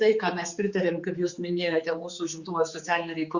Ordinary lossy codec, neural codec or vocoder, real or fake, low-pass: Opus, 64 kbps; codec, 16 kHz, 4 kbps, X-Codec, HuBERT features, trained on general audio; fake; 7.2 kHz